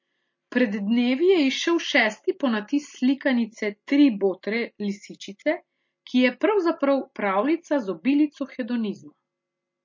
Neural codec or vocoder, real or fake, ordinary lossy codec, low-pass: none; real; MP3, 32 kbps; 7.2 kHz